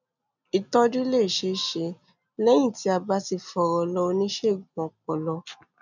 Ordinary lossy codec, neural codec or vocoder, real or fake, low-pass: none; none; real; 7.2 kHz